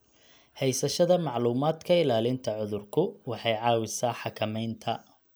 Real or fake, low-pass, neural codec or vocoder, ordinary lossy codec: real; none; none; none